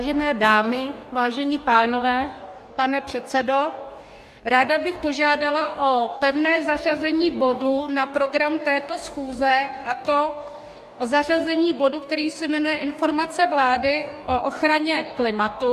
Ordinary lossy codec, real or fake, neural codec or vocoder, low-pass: AAC, 96 kbps; fake; codec, 44.1 kHz, 2.6 kbps, DAC; 14.4 kHz